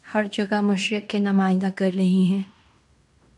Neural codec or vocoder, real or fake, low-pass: codec, 16 kHz in and 24 kHz out, 0.9 kbps, LongCat-Audio-Codec, fine tuned four codebook decoder; fake; 10.8 kHz